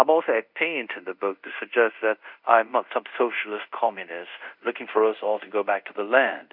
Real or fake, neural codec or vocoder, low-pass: fake; codec, 24 kHz, 0.5 kbps, DualCodec; 5.4 kHz